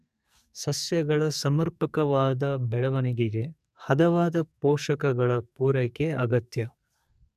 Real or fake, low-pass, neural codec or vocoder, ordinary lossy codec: fake; 14.4 kHz; codec, 44.1 kHz, 2.6 kbps, SNAC; none